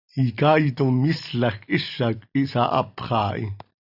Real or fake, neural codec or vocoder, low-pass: real; none; 5.4 kHz